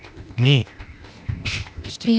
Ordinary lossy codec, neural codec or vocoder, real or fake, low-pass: none; codec, 16 kHz, 0.8 kbps, ZipCodec; fake; none